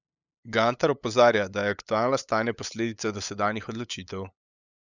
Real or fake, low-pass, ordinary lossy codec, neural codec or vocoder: fake; 7.2 kHz; none; codec, 16 kHz, 8 kbps, FunCodec, trained on LibriTTS, 25 frames a second